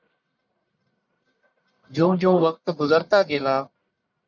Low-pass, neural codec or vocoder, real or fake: 7.2 kHz; codec, 44.1 kHz, 1.7 kbps, Pupu-Codec; fake